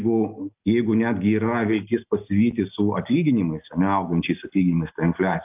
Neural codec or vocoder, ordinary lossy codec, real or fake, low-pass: none; AAC, 32 kbps; real; 3.6 kHz